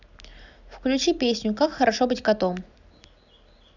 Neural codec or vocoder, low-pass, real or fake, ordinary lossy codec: none; 7.2 kHz; real; none